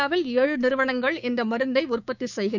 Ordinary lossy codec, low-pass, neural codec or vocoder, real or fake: none; 7.2 kHz; codec, 16 kHz, 6 kbps, DAC; fake